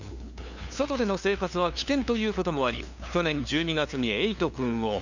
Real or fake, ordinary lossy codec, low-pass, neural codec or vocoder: fake; none; 7.2 kHz; codec, 16 kHz, 2 kbps, FunCodec, trained on LibriTTS, 25 frames a second